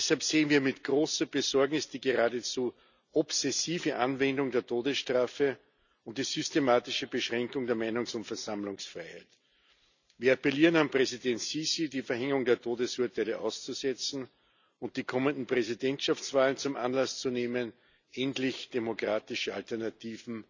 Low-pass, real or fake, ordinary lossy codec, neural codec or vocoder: 7.2 kHz; real; none; none